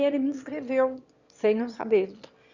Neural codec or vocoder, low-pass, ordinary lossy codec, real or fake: autoencoder, 22.05 kHz, a latent of 192 numbers a frame, VITS, trained on one speaker; 7.2 kHz; Opus, 64 kbps; fake